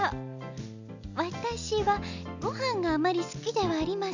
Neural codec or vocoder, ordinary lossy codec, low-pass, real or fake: none; none; 7.2 kHz; real